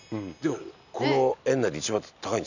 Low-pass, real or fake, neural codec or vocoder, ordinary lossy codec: 7.2 kHz; real; none; none